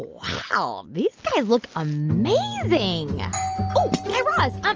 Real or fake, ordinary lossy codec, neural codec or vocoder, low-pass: real; Opus, 24 kbps; none; 7.2 kHz